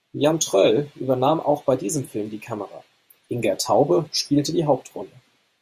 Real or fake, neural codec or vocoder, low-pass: fake; vocoder, 48 kHz, 128 mel bands, Vocos; 14.4 kHz